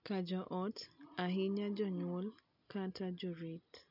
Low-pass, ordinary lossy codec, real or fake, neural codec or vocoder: 5.4 kHz; none; real; none